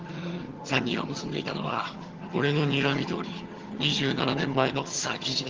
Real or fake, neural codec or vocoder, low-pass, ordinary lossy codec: fake; vocoder, 22.05 kHz, 80 mel bands, HiFi-GAN; 7.2 kHz; Opus, 16 kbps